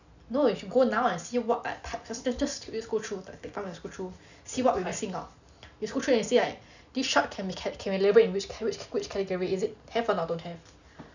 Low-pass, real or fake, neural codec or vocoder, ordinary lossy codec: 7.2 kHz; real; none; none